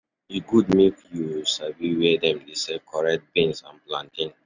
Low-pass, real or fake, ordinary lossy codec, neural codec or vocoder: 7.2 kHz; real; none; none